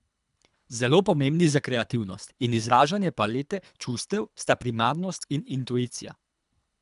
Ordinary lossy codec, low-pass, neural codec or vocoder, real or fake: none; 10.8 kHz; codec, 24 kHz, 3 kbps, HILCodec; fake